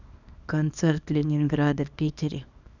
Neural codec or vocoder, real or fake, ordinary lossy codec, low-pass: codec, 24 kHz, 0.9 kbps, WavTokenizer, small release; fake; none; 7.2 kHz